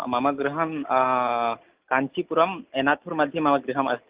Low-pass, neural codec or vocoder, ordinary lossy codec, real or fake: 3.6 kHz; none; Opus, 32 kbps; real